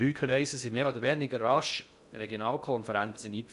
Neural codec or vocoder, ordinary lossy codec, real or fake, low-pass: codec, 16 kHz in and 24 kHz out, 0.6 kbps, FocalCodec, streaming, 2048 codes; none; fake; 10.8 kHz